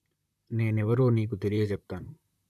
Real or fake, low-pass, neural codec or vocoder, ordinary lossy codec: fake; 14.4 kHz; vocoder, 44.1 kHz, 128 mel bands, Pupu-Vocoder; none